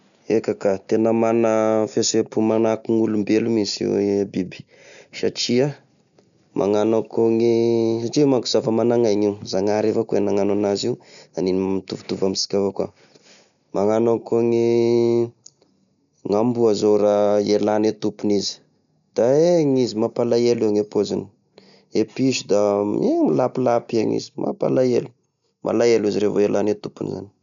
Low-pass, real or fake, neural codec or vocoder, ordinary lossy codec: 7.2 kHz; real; none; none